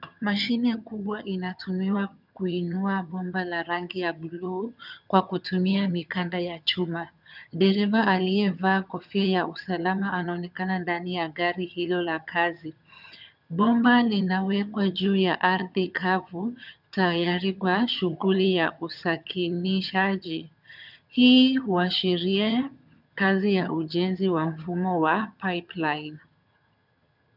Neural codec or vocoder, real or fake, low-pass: vocoder, 22.05 kHz, 80 mel bands, HiFi-GAN; fake; 5.4 kHz